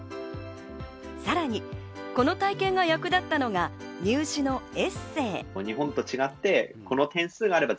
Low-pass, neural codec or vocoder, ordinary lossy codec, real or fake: none; none; none; real